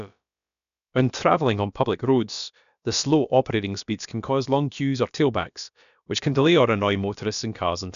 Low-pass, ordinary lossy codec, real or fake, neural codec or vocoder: 7.2 kHz; none; fake; codec, 16 kHz, about 1 kbps, DyCAST, with the encoder's durations